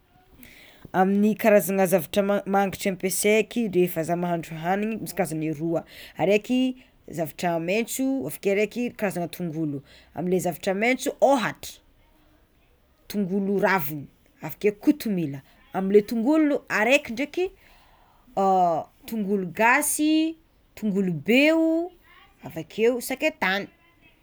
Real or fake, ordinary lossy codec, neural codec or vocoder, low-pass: real; none; none; none